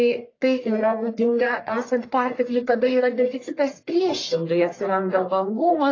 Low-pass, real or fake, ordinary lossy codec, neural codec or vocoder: 7.2 kHz; fake; AAC, 32 kbps; codec, 44.1 kHz, 1.7 kbps, Pupu-Codec